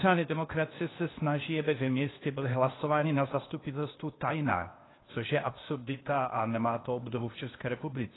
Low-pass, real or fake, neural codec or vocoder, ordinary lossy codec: 7.2 kHz; fake; codec, 16 kHz, 0.8 kbps, ZipCodec; AAC, 16 kbps